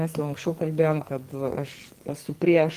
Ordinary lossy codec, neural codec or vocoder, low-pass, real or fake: Opus, 24 kbps; codec, 44.1 kHz, 2.6 kbps, SNAC; 14.4 kHz; fake